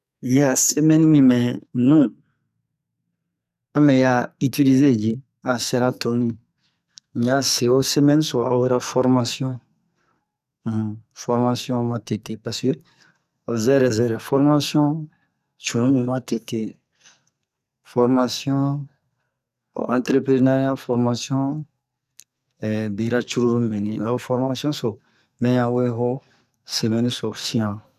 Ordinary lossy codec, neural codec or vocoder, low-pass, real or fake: none; codec, 44.1 kHz, 2.6 kbps, SNAC; 14.4 kHz; fake